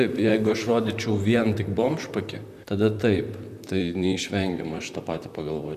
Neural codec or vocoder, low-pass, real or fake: vocoder, 44.1 kHz, 128 mel bands, Pupu-Vocoder; 14.4 kHz; fake